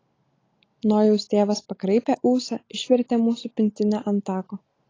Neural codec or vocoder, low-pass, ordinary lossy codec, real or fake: none; 7.2 kHz; AAC, 32 kbps; real